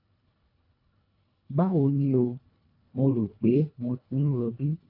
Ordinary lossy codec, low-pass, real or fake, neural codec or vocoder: AAC, 48 kbps; 5.4 kHz; fake; codec, 24 kHz, 1.5 kbps, HILCodec